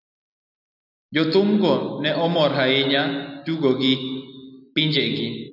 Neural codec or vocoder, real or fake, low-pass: none; real; 5.4 kHz